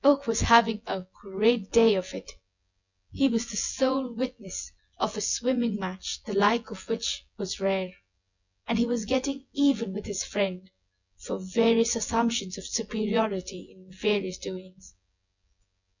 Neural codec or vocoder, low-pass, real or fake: vocoder, 24 kHz, 100 mel bands, Vocos; 7.2 kHz; fake